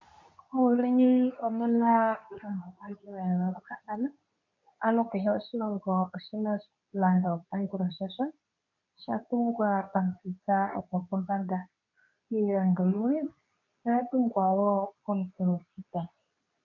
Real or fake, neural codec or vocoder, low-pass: fake; codec, 24 kHz, 0.9 kbps, WavTokenizer, medium speech release version 2; 7.2 kHz